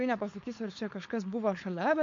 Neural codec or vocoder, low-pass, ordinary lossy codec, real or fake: codec, 16 kHz, 4.8 kbps, FACodec; 7.2 kHz; MP3, 48 kbps; fake